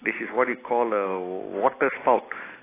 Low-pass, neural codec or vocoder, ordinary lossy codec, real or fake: 3.6 kHz; none; AAC, 16 kbps; real